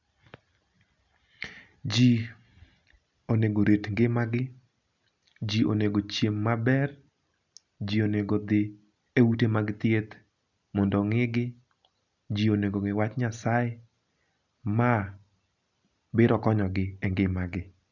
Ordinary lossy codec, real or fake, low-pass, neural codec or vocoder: none; real; 7.2 kHz; none